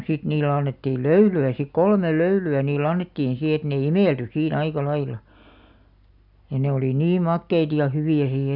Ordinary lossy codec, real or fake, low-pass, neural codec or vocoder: none; real; 5.4 kHz; none